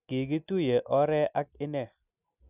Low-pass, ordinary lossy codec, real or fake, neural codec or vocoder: 3.6 kHz; none; real; none